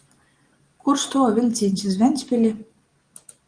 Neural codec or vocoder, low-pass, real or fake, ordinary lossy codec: none; 9.9 kHz; real; Opus, 24 kbps